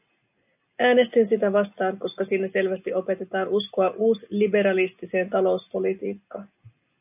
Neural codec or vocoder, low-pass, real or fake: none; 3.6 kHz; real